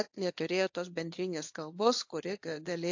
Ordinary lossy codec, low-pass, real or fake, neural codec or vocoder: AAC, 48 kbps; 7.2 kHz; fake; codec, 24 kHz, 0.9 kbps, WavTokenizer, medium speech release version 1